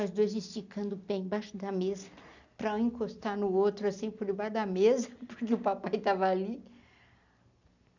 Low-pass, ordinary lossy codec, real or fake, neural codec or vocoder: 7.2 kHz; none; real; none